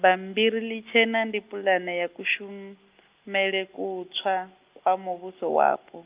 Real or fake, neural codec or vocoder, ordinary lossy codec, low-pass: real; none; Opus, 24 kbps; 3.6 kHz